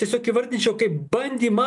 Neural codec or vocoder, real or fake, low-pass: none; real; 10.8 kHz